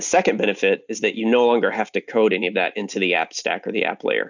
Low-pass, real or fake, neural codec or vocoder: 7.2 kHz; real; none